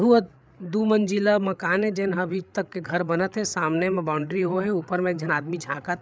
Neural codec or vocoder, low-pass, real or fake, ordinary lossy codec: codec, 16 kHz, 8 kbps, FreqCodec, larger model; none; fake; none